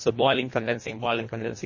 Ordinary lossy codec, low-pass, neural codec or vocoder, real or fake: MP3, 32 kbps; 7.2 kHz; codec, 24 kHz, 1.5 kbps, HILCodec; fake